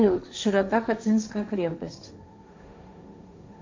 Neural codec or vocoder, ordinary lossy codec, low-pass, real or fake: codec, 16 kHz, 1.1 kbps, Voila-Tokenizer; MP3, 64 kbps; 7.2 kHz; fake